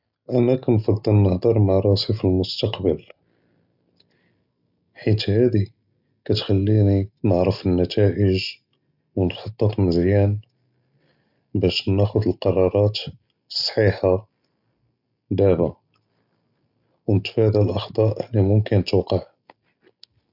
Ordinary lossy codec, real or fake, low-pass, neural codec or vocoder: none; real; 5.4 kHz; none